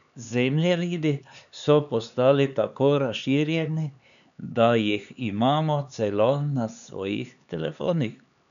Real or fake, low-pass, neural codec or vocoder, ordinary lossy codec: fake; 7.2 kHz; codec, 16 kHz, 4 kbps, X-Codec, HuBERT features, trained on LibriSpeech; none